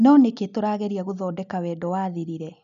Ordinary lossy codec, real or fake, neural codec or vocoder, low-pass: none; real; none; 7.2 kHz